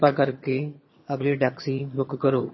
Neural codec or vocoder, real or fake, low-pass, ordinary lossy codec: vocoder, 22.05 kHz, 80 mel bands, HiFi-GAN; fake; 7.2 kHz; MP3, 24 kbps